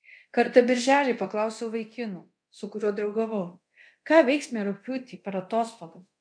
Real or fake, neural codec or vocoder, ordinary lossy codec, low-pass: fake; codec, 24 kHz, 0.9 kbps, DualCodec; MP3, 64 kbps; 9.9 kHz